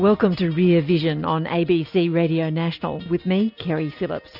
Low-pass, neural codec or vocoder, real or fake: 5.4 kHz; none; real